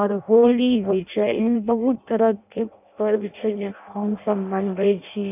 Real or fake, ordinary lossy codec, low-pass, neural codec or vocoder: fake; none; 3.6 kHz; codec, 16 kHz in and 24 kHz out, 0.6 kbps, FireRedTTS-2 codec